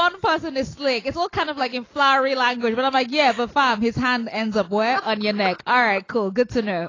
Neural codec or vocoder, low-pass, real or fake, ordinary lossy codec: none; 7.2 kHz; real; AAC, 32 kbps